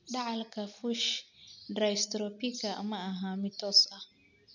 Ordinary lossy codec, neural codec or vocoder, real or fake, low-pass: none; none; real; 7.2 kHz